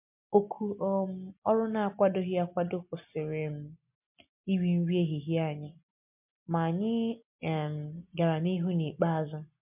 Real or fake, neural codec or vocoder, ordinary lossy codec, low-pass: real; none; MP3, 32 kbps; 3.6 kHz